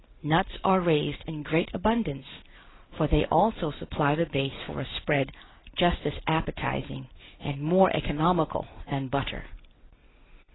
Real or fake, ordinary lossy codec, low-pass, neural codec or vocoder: real; AAC, 16 kbps; 7.2 kHz; none